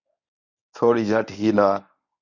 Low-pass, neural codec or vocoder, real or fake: 7.2 kHz; codec, 24 kHz, 0.9 kbps, WavTokenizer, medium speech release version 1; fake